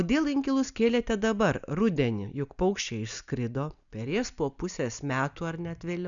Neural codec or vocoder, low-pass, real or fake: none; 7.2 kHz; real